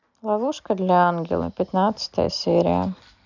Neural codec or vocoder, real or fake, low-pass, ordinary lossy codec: none; real; 7.2 kHz; none